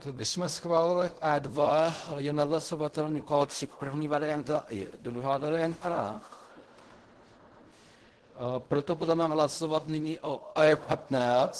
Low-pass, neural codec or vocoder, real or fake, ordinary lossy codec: 10.8 kHz; codec, 16 kHz in and 24 kHz out, 0.4 kbps, LongCat-Audio-Codec, fine tuned four codebook decoder; fake; Opus, 16 kbps